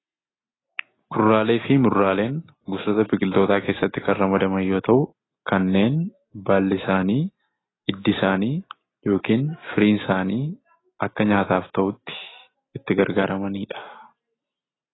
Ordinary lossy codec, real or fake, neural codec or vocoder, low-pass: AAC, 16 kbps; real; none; 7.2 kHz